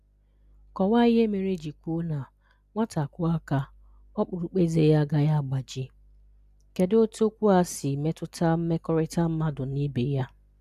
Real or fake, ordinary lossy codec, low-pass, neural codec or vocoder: real; none; 14.4 kHz; none